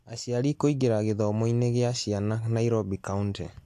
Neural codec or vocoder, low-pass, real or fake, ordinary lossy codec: none; 14.4 kHz; real; AAC, 64 kbps